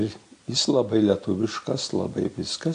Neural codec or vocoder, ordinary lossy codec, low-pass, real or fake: none; AAC, 48 kbps; 9.9 kHz; real